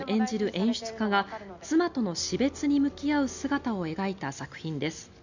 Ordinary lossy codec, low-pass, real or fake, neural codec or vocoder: none; 7.2 kHz; real; none